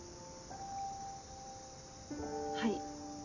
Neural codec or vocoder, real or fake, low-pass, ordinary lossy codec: none; real; 7.2 kHz; none